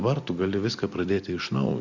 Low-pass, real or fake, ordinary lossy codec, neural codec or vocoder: 7.2 kHz; real; Opus, 64 kbps; none